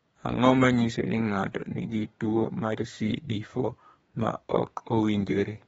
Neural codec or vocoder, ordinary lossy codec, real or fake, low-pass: codec, 32 kHz, 1.9 kbps, SNAC; AAC, 24 kbps; fake; 14.4 kHz